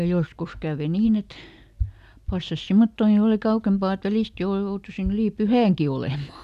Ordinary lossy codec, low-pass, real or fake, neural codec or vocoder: none; 14.4 kHz; real; none